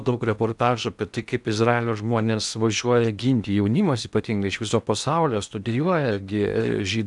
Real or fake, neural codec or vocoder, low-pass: fake; codec, 16 kHz in and 24 kHz out, 0.8 kbps, FocalCodec, streaming, 65536 codes; 10.8 kHz